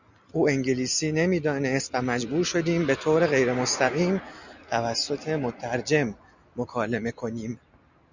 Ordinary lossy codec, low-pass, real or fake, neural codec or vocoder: Opus, 64 kbps; 7.2 kHz; real; none